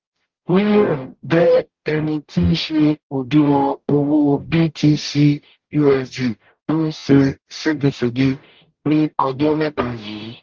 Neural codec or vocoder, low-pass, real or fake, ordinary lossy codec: codec, 44.1 kHz, 0.9 kbps, DAC; 7.2 kHz; fake; Opus, 16 kbps